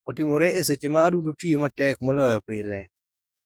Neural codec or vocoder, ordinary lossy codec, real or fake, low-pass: codec, 44.1 kHz, 2.6 kbps, DAC; none; fake; none